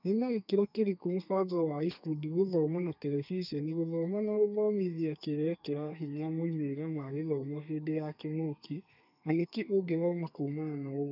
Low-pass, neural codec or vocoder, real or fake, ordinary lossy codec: 5.4 kHz; codec, 32 kHz, 1.9 kbps, SNAC; fake; none